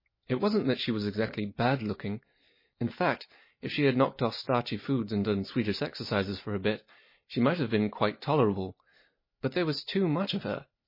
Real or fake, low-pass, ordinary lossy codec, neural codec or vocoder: real; 5.4 kHz; MP3, 24 kbps; none